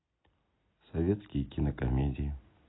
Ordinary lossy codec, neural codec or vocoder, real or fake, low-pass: AAC, 16 kbps; none; real; 7.2 kHz